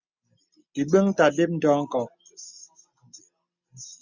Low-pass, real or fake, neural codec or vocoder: 7.2 kHz; real; none